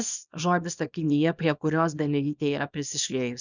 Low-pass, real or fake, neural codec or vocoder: 7.2 kHz; fake; codec, 24 kHz, 0.9 kbps, WavTokenizer, small release